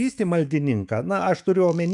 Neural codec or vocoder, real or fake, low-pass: autoencoder, 48 kHz, 128 numbers a frame, DAC-VAE, trained on Japanese speech; fake; 10.8 kHz